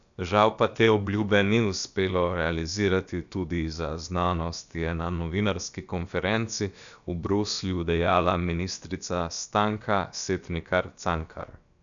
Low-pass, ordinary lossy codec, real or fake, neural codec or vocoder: 7.2 kHz; none; fake; codec, 16 kHz, about 1 kbps, DyCAST, with the encoder's durations